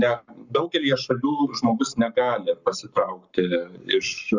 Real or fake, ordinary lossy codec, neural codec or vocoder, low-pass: fake; Opus, 64 kbps; codec, 44.1 kHz, 7.8 kbps, Pupu-Codec; 7.2 kHz